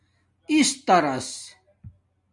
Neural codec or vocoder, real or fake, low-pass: none; real; 10.8 kHz